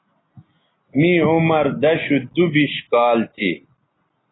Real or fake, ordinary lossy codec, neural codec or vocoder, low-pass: real; AAC, 16 kbps; none; 7.2 kHz